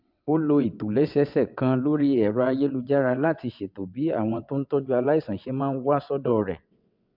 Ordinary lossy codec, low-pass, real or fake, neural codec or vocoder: MP3, 48 kbps; 5.4 kHz; fake; vocoder, 22.05 kHz, 80 mel bands, WaveNeXt